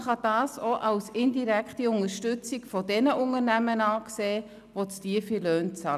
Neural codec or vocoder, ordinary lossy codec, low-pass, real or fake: none; none; 14.4 kHz; real